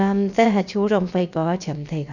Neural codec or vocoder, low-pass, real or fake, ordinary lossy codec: codec, 16 kHz, about 1 kbps, DyCAST, with the encoder's durations; 7.2 kHz; fake; none